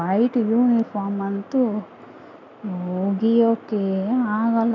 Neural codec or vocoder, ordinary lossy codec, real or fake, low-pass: none; none; real; 7.2 kHz